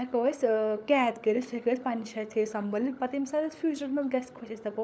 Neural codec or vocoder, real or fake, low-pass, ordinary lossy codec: codec, 16 kHz, 16 kbps, FunCodec, trained on LibriTTS, 50 frames a second; fake; none; none